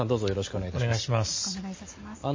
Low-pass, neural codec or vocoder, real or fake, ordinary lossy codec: 7.2 kHz; none; real; MP3, 32 kbps